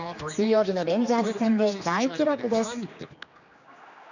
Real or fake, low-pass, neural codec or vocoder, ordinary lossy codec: fake; 7.2 kHz; codec, 16 kHz, 2 kbps, X-Codec, HuBERT features, trained on general audio; none